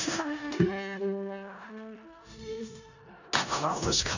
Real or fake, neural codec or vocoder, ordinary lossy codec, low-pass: fake; codec, 16 kHz in and 24 kHz out, 0.4 kbps, LongCat-Audio-Codec, four codebook decoder; none; 7.2 kHz